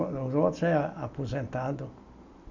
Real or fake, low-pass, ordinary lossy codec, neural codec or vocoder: real; 7.2 kHz; MP3, 64 kbps; none